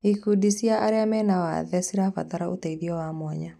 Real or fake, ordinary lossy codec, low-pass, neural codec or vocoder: real; none; 14.4 kHz; none